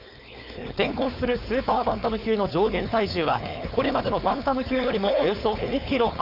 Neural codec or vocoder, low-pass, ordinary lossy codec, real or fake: codec, 16 kHz, 4.8 kbps, FACodec; 5.4 kHz; none; fake